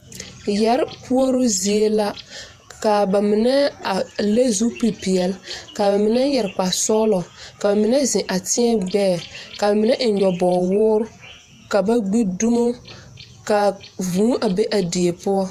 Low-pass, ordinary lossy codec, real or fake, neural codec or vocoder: 14.4 kHz; AAC, 96 kbps; fake; vocoder, 48 kHz, 128 mel bands, Vocos